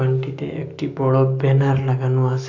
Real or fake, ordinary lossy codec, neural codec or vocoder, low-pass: real; AAC, 48 kbps; none; 7.2 kHz